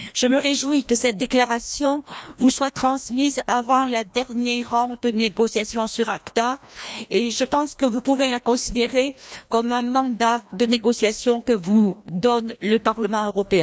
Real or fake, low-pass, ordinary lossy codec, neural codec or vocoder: fake; none; none; codec, 16 kHz, 1 kbps, FreqCodec, larger model